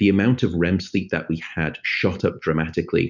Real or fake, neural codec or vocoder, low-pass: real; none; 7.2 kHz